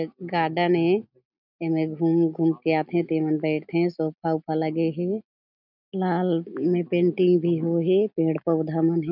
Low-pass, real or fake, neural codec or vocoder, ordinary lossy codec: 5.4 kHz; real; none; none